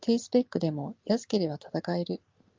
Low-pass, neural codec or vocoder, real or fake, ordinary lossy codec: 7.2 kHz; none; real; Opus, 32 kbps